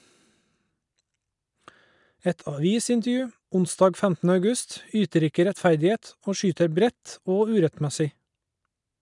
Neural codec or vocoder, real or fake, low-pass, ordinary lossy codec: none; real; 10.8 kHz; none